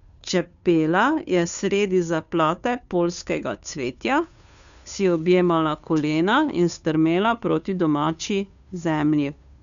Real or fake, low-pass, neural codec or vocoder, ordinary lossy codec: fake; 7.2 kHz; codec, 16 kHz, 2 kbps, FunCodec, trained on Chinese and English, 25 frames a second; none